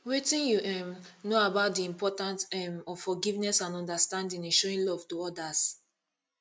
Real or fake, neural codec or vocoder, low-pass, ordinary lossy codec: real; none; none; none